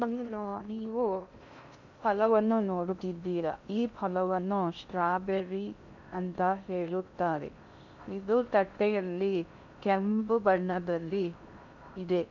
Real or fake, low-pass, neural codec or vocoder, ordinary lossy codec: fake; 7.2 kHz; codec, 16 kHz in and 24 kHz out, 0.6 kbps, FocalCodec, streaming, 2048 codes; none